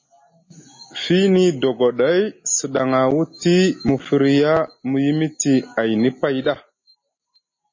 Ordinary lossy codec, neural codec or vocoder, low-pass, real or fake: MP3, 32 kbps; none; 7.2 kHz; real